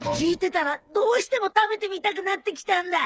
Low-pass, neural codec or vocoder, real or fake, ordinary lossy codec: none; codec, 16 kHz, 4 kbps, FreqCodec, smaller model; fake; none